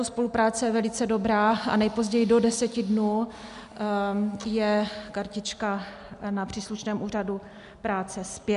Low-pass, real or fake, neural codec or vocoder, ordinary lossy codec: 10.8 kHz; real; none; MP3, 96 kbps